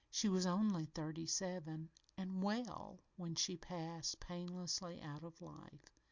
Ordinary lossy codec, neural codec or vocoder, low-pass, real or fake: Opus, 64 kbps; none; 7.2 kHz; real